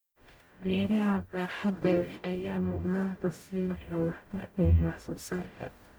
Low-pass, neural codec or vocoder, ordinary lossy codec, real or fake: none; codec, 44.1 kHz, 0.9 kbps, DAC; none; fake